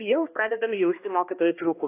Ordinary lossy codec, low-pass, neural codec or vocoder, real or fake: AAC, 24 kbps; 3.6 kHz; codec, 16 kHz, 1 kbps, X-Codec, HuBERT features, trained on balanced general audio; fake